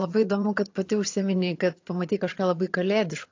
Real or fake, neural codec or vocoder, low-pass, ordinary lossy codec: fake; vocoder, 22.05 kHz, 80 mel bands, HiFi-GAN; 7.2 kHz; AAC, 48 kbps